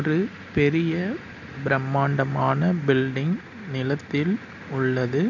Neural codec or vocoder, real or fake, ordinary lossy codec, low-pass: none; real; none; 7.2 kHz